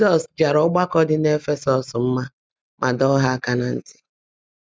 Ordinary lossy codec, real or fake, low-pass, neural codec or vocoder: none; real; none; none